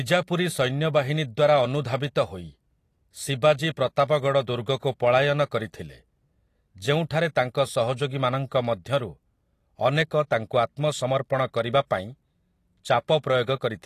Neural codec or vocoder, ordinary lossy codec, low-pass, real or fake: none; AAC, 48 kbps; 14.4 kHz; real